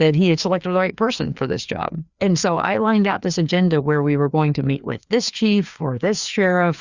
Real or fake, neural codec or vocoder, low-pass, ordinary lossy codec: fake; codec, 16 kHz, 2 kbps, FreqCodec, larger model; 7.2 kHz; Opus, 64 kbps